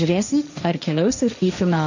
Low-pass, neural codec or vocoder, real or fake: 7.2 kHz; codec, 16 kHz, 1.1 kbps, Voila-Tokenizer; fake